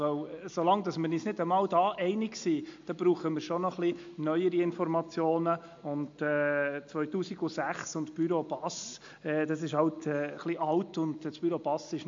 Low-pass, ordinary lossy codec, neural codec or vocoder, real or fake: 7.2 kHz; MP3, 48 kbps; none; real